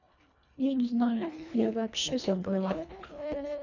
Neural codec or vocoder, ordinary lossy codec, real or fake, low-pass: codec, 24 kHz, 1.5 kbps, HILCodec; none; fake; 7.2 kHz